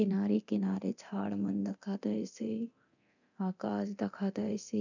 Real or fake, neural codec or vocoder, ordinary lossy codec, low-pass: fake; codec, 24 kHz, 0.9 kbps, DualCodec; none; 7.2 kHz